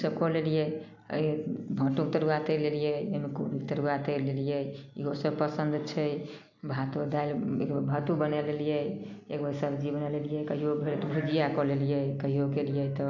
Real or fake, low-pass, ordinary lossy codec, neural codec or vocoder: real; 7.2 kHz; none; none